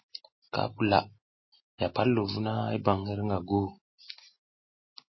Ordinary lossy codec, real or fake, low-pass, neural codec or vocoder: MP3, 24 kbps; real; 7.2 kHz; none